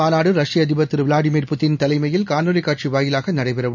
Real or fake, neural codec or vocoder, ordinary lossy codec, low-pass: real; none; none; 7.2 kHz